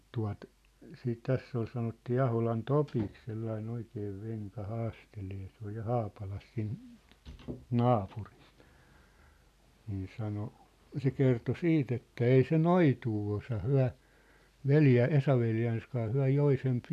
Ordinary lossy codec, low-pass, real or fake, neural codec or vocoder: none; 14.4 kHz; real; none